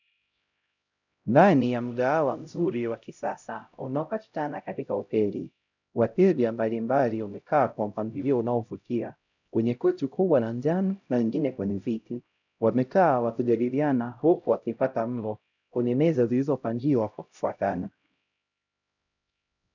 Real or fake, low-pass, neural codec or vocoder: fake; 7.2 kHz; codec, 16 kHz, 0.5 kbps, X-Codec, HuBERT features, trained on LibriSpeech